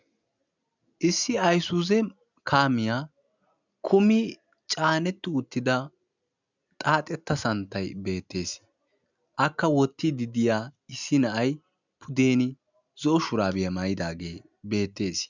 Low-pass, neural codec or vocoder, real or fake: 7.2 kHz; none; real